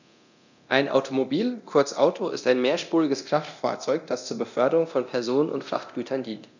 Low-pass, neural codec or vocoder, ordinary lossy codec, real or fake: 7.2 kHz; codec, 24 kHz, 0.9 kbps, DualCodec; none; fake